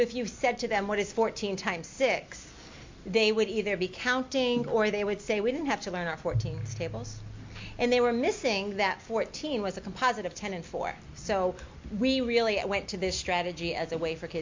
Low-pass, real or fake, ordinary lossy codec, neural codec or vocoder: 7.2 kHz; real; MP3, 48 kbps; none